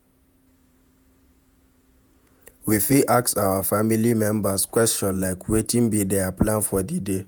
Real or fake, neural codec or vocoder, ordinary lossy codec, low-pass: fake; vocoder, 48 kHz, 128 mel bands, Vocos; none; none